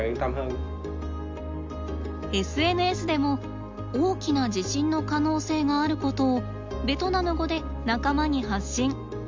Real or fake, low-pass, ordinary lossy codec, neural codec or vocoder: real; 7.2 kHz; MP3, 64 kbps; none